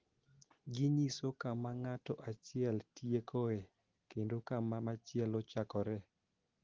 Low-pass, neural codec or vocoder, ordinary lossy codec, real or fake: 7.2 kHz; none; Opus, 16 kbps; real